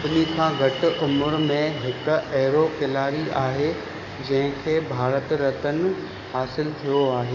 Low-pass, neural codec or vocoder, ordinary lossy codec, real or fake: 7.2 kHz; codec, 44.1 kHz, 7.8 kbps, DAC; none; fake